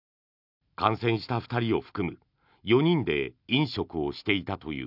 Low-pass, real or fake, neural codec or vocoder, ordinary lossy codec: 5.4 kHz; real; none; none